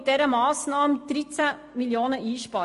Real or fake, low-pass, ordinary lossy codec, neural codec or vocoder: real; 14.4 kHz; MP3, 48 kbps; none